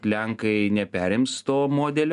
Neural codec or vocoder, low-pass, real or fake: none; 10.8 kHz; real